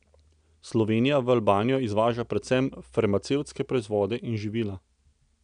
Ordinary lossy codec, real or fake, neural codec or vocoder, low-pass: none; real; none; 9.9 kHz